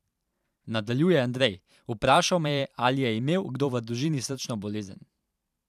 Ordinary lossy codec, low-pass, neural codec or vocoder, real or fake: none; 14.4 kHz; vocoder, 44.1 kHz, 128 mel bands every 512 samples, BigVGAN v2; fake